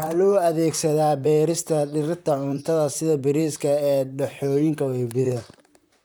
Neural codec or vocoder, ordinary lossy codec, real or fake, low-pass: vocoder, 44.1 kHz, 128 mel bands, Pupu-Vocoder; none; fake; none